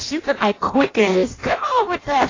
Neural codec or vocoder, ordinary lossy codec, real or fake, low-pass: codec, 16 kHz in and 24 kHz out, 0.6 kbps, FireRedTTS-2 codec; AAC, 32 kbps; fake; 7.2 kHz